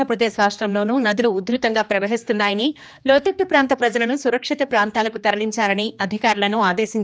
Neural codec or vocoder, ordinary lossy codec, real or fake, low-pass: codec, 16 kHz, 2 kbps, X-Codec, HuBERT features, trained on general audio; none; fake; none